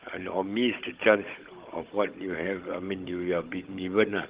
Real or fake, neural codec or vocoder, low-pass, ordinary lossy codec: fake; codec, 16 kHz, 16 kbps, FunCodec, trained on Chinese and English, 50 frames a second; 3.6 kHz; Opus, 16 kbps